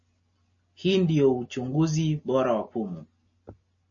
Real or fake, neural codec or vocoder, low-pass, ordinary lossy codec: real; none; 7.2 kHz; MP3, 32 kbps